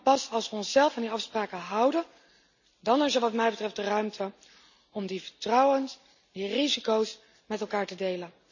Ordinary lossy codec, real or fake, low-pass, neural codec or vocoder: none; real; 7.2 kHz; none